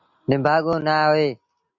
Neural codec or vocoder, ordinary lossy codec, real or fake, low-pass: none; MP3, 48 kbps; real; 7.2 kHz